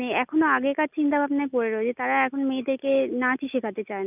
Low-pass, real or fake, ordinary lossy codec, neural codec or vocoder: 3.6 kHz; real; none; none